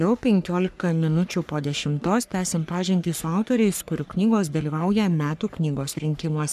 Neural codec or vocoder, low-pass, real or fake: codec, 44.1 kHz, 3.4 kbps, Pupu-Codec; 14.4 kHz; fake